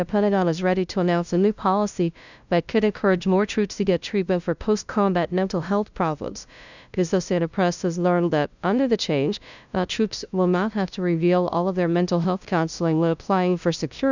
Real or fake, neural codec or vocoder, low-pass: fake; codec, 16 kHz, 0.5 kbps, FunCodec, trained on LibriTTS, 25 frames a second; 7.2 kHz